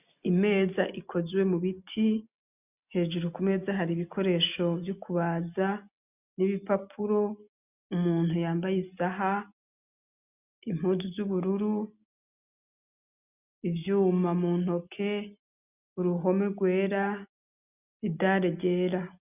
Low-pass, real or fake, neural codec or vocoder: 3.6 kHz; real; none